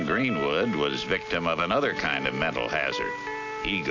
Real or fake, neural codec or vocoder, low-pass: real; none; 7.2 kHz